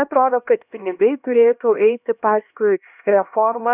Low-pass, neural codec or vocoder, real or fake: 3.6 kHz; codec, 16 kHz, 1 kbps, X-Codec, HuBERT features, trained on LibriSpeech; fake